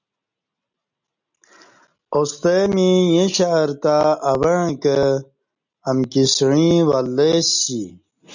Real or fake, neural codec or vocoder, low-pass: real; none; 7.2 kHz